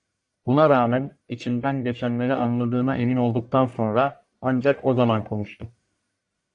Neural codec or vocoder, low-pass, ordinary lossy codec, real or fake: codec, 44.1 kHz, 1.7 kbps, Pupu-Codec; 10.8 kHz; AAC, 64 kbps; fake